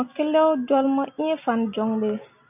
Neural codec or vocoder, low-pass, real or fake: none; 3.6 kHz; real